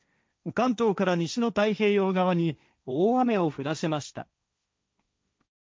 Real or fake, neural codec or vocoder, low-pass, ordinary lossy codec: fake; codec, 16 kHz, 1.1 kbps, Voila-Tokenizer; none; none